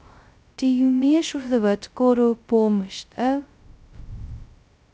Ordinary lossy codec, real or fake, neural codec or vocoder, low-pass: none; fake; codec, 16 kHz, 0.2 kbps, FocalCodec; none